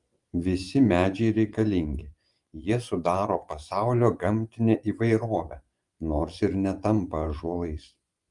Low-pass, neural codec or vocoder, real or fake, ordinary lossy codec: 10.8 kHz; vocoder, 24 kHz, 100 mel bands, Vocos; fake; Opus, 32 kbps